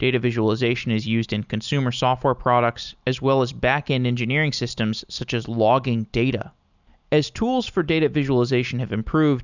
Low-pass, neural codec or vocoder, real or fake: 7.2 kHz; none; real